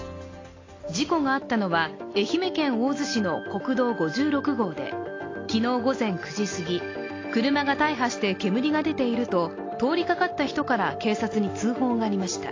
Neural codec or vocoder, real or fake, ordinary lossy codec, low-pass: none; real; AAC, 32 kbps; 7.2 kHz